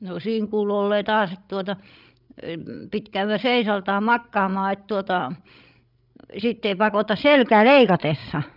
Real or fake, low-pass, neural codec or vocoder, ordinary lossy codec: fake; 5.4 kHz; codec, 16 kHz, 16 kbps, FreqCodec, larger model; none